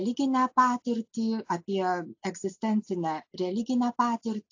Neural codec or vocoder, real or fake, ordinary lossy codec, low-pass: none; real; AAC, 48 kbps; 7.2 kHz